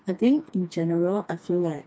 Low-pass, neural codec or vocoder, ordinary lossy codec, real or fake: none; codec, 16 kHz, 2 kbps, FreqCodec, smaller model; none; fake